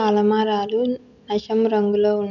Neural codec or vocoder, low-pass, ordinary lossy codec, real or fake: none; 7.2 kHz; none; real